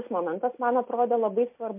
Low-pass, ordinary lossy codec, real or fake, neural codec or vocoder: 3.6 kHz; MP3, 32 kbps; real; none